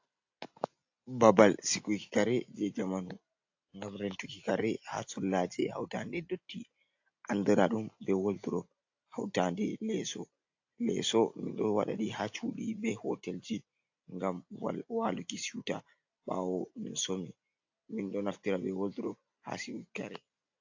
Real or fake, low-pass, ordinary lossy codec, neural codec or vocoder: real; 7.2 kHz; AAC, 48 kbps; none